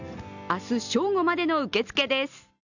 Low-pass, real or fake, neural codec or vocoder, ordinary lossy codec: 7.2 kHz; real; none; none